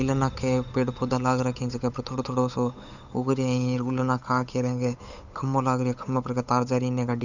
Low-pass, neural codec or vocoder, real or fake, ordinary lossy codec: 7.2 kHz; codec, 16 kHz, 8 kbps, FunCodec, trained on Chinese and English, 25 frames a second; fake; none